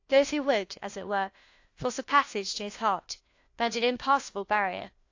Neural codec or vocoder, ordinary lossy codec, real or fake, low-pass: codec, 16 kHz, 1 kbps, FunCodec, trained on LibriTTS, 50 frames a second; AAC, 48 kbps; fake; 7.2 kHz